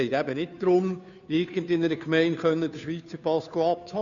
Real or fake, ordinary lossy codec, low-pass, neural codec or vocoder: fake; none; 7.2 kHz; codec, 16 kHz, 2 kbps, FunCodec, trained on Chinese and English, 25 frames a second